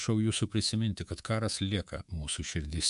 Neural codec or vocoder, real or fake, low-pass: codec, 24 kHz, 3.1 kbps, DualCodec; fake; 10.8 kHz